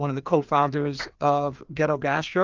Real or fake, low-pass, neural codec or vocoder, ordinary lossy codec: fake; 7.2 kHz; codec, 16 kHz, 2 kbps, FreqCodec, larger model; Opus, 32 kbps